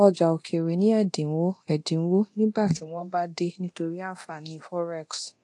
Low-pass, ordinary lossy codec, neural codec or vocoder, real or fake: 10.8 kHz; AAC, 48 kbps; codec, 24 kHz, 0.9 kbps, DualCodec; fake